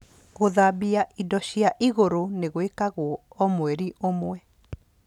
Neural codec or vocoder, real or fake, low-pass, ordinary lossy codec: none; real; 19.8 kHz; none